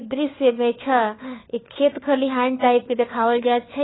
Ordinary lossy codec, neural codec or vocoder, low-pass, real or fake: AAC, 16 kbps; codec, 16 kHz, 4 kbps, FunCodec, trained on LibriTTS, 50 frames a second; 7.2 kHz; fake